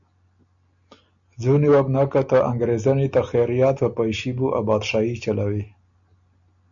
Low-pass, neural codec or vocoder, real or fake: 7.2 kHz; none; real